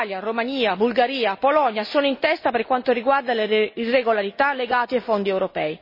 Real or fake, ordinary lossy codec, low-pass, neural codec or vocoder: real; MP3, 24 kbps; 5.4 kHz; none